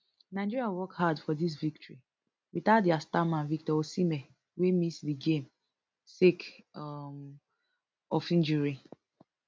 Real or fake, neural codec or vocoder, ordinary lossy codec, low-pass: real; none; none; none